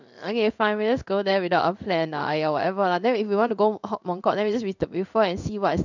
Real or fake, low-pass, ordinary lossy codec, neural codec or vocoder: fake; 7.2 kHz; none; codec, 16 kHz in and 24 kHz out, 1 kbps, XY-Tokenizer